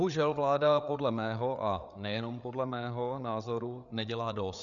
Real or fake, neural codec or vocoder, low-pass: fake; codec, 16 kHz, 8 kbps, FreqCodec, larger model; 7.2 kHz